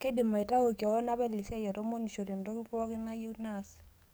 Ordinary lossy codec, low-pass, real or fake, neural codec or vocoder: none; none; fake; codec, 44.1 kHz, 7.8 kbps, DAC